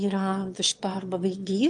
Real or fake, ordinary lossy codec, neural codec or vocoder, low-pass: fake; Opus, 32 kbps; autoencoder, 22.05 kHz, a latent of 192 numbers a frame, VITS, trained on one speaker; 9.9 kHz